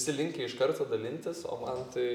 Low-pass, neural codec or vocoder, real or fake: 19.8 kHz; none; real